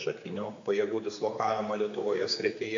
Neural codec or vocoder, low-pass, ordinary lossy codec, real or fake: codec, 16 kHz, 4 kbps, X-Codec, HuBERT features, trained on general audio; 7.2 kHz; AAC, 48 kbps; fake